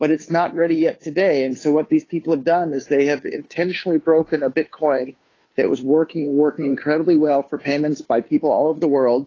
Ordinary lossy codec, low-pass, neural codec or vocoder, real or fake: AAC, 32 kbps; 7.2 kHz; codec, 16 kHz, 2 kbps, FunCodec, trained on Chinese and English, 25 frames a second; fake